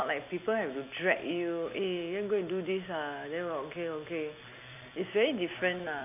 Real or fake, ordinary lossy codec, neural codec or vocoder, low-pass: fake; none; vocoder, 44.1 kHz, 128 mel bands every 256 samples, BigVGAN v2; 3.6 kHz